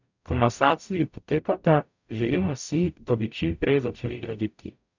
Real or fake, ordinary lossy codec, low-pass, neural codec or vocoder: fake; none; 7.2 kHz; codec, 44.1 kHz, 0.9 kbps, DAC